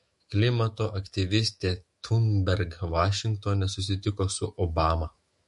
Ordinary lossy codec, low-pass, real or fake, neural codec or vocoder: MP3, 48 kbps; 14.4 kHz; fake; autoencoder, 48 kHz, 128 numbers a frame, DAC-VAE, trained on Japanese speech